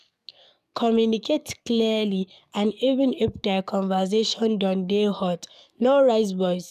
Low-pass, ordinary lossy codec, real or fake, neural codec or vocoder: 14.4 kHz; none; fake; codec, 44.1 kHz, 7.8 kbps, DAC